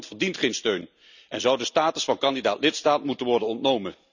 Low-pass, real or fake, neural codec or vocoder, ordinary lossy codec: 7.2 kHz; real; none; none